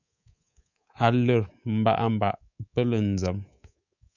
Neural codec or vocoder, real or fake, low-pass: codec, 24 kHz, 3.1 kbps, DualCodec; fake; 7.2 kHz